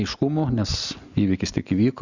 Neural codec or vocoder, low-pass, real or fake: none; 7.2 kHz; real